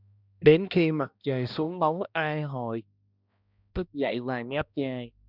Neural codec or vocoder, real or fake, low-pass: codec, 16 kHz, 1 kbps, X-Codec, HuBERT features, trained on balanced general audio; fake; 5.4 kHz